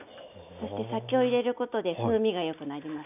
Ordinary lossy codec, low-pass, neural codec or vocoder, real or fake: none; 3.6 kHz; none; real